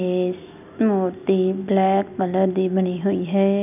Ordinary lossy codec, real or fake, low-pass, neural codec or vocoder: none; real; 3.6 kHz; none